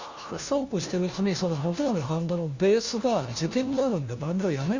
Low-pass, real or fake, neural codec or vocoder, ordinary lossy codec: 7.2 kHz; fake; codec, 16 kHz, 1 kbps, FunCodec, trained on LibriTTS, 50 frames a second; Opus, 64 kbps